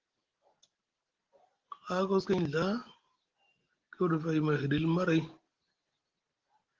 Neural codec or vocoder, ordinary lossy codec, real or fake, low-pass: none; Opus, 16 kbps; real; 7.2 kHz